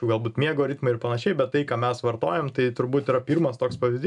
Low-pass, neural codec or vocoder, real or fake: 10.8 kHz; none; real